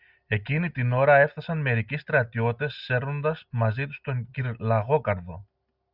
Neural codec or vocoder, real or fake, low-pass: none; real; 5.4 kHz